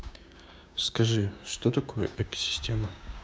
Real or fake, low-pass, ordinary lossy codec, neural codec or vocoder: fake; none; none; codec, 16 kHz, 6 kbps, DAC